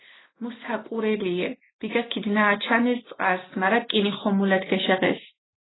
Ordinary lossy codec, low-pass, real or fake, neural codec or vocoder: AAC, 16 kbps; 7.2 kHz; real; none